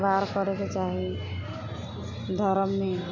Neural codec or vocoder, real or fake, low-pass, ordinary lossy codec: none; real; 7.2 kHz; none